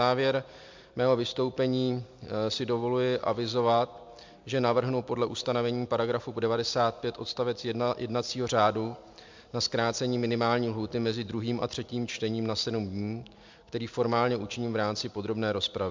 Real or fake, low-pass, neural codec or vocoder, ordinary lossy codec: real; 7.2 kHz; none; MP3, 64 kbps